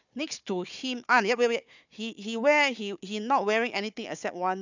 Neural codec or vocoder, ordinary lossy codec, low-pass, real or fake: codec, 16 kHz, 4 kbps, FunCodec, trained on Chinese and English, 50 frames a second; none; 7.2 kHz; fake